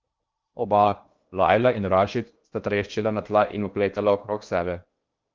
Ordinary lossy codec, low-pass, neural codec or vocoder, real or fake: Opus, 32 kbps; 7.2 kHz; codec, 16 kHz in and 24 kHz out, 0.8 kbps, FocalCodec, streaming, 65536 codes; fake